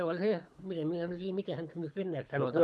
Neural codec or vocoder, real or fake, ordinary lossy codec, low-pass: codec, 24 kHz, 6 kbps, HILCodec; fake; none; none